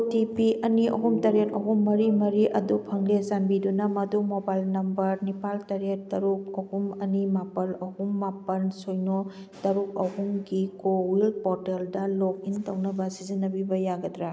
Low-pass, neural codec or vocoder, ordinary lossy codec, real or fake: none; none; none; real